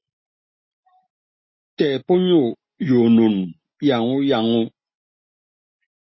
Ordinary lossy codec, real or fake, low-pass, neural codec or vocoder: MP3, 24 kbps; real; 7.2 kHz; none